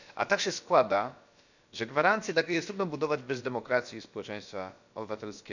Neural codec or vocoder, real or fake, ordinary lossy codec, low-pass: codec, 16 kHz, about 1 kbps, DyCAST, with the encoder's durations; fake; none; 7.2 kHz